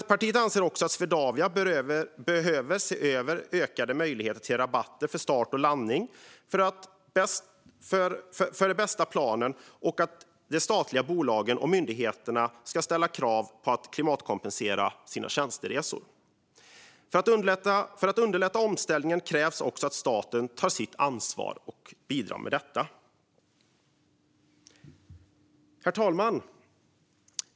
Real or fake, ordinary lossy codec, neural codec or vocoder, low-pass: real; none; none; none